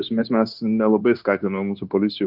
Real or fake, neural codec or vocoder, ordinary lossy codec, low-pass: fake; codec, 16 kHz, 0.9 kbps, LongCat-Audio-Codec; Opus, 24 kbps; 5.4 kHz